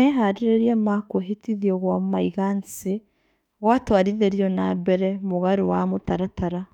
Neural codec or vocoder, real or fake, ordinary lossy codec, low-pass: autoencoder, 48 kHz, 32 numbers a frame, DAC-VAE, trained on Japanese speech; fake; none; 19.8 kHz